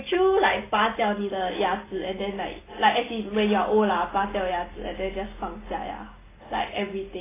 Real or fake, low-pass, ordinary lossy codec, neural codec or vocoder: real; 3.6 kHz; AAC, 16 kbps; none